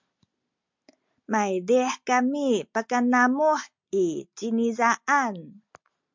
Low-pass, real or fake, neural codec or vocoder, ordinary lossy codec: 7.2 kHz; real; none; MP3, 64 kbps